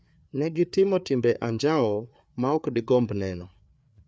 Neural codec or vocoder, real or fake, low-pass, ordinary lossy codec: codec, 16 kHz, 4 kbps, FreqCodec, larger model; fake; none; none